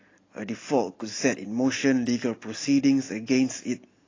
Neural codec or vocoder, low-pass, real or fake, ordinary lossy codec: none; 7.2 kHz; real; AAC, 32 kbps